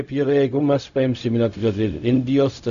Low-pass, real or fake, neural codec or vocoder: 7.2 kHz; fake; codec, 16 kHz, 0.4 kbps, LongCat-Audio-Codec